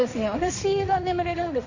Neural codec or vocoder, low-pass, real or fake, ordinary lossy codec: codec, 16 kHz, 1.1 kbps, Voila-Tokenizer; 7.2 kHz; fake; none